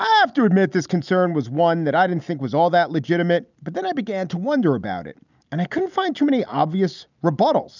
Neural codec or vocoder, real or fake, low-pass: none; real; 7.2 kHz